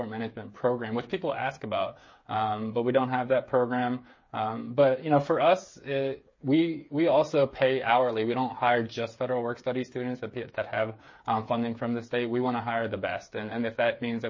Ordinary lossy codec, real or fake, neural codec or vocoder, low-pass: MP3, 32 kbps; fake; codec, 16 kHz, 8 kbps, FreqCodec, smaller model; 7.2 kHz